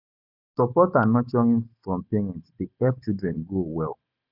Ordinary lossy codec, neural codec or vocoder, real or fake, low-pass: none; none; real; 5.4 kHz